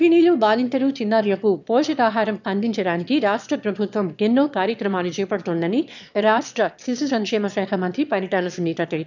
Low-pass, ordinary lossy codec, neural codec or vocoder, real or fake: 7.2 kHz; none; autoencoder, 22.05 kHz, a latent of 192 numbers a frame, VITS, trained on one speaker; fake